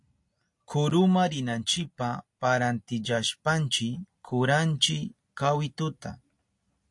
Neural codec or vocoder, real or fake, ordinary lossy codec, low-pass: none; real; AAC, 64 kbps; 10.8 kHz